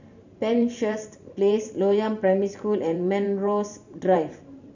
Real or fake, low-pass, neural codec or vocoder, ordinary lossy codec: fake; 7.2 kHz; vocoder, 44.1 kHz, 128 mel bands every 512 samples, BigVGAN v2; none